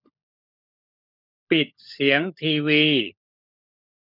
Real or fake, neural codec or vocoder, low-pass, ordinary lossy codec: fake; codec, 16 kHz, 16 kbps, FunCodec, trained on LibriTTS, 50 frames a second; 5.4 kHz; none